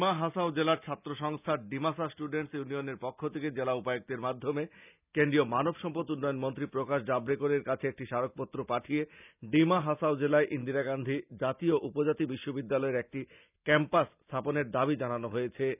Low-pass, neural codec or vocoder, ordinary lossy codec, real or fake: 3.6 kHz; none; none; real